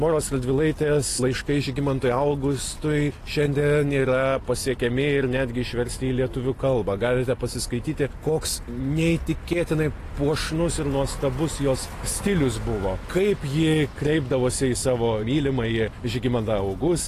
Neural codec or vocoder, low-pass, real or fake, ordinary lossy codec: none; 14.4 kHz; real; AAC, 48 kbps